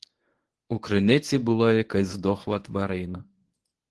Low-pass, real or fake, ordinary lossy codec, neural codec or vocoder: 10.8 kHz; fake; Opus, 16 kbps; codec, 24 kHz, 0.9 kbps, WavTokenizer, medium speech release version 1